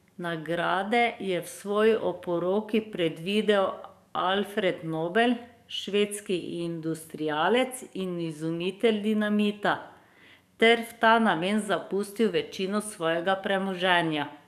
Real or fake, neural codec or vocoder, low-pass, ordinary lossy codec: fake; codec, 44.1 kHz, 7.8 kbps, DAC; 14.4 kHz; none